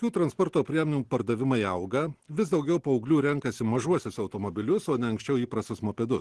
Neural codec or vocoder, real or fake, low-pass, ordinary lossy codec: none; real; 10.8 kHz; Opus, 16 kbps